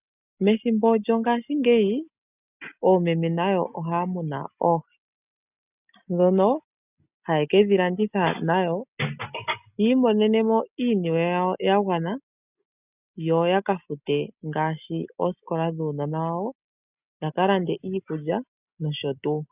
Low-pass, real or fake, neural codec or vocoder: 3.6 kHz; real; none